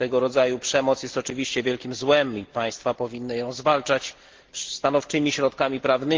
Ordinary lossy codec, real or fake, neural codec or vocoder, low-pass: Opus, 16 kbps; real; none; 7.2 kHz